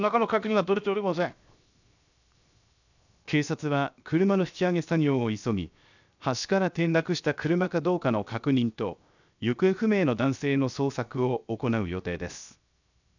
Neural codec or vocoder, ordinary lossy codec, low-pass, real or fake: codec, 16 kHz, 0.7 kbps, FocalCodec; none; 7.2 kHz; fake